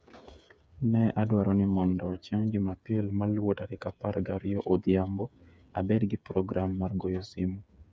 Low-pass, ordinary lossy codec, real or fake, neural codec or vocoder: none; none; fake; codec, 16 kHz, 8 kbps, FreqCodec, smaller model